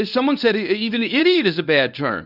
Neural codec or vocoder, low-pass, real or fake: codec, 24 kHz, 0.9 kbps, WavTokenizer, medium speech release version 1; 5.4 kHz; fake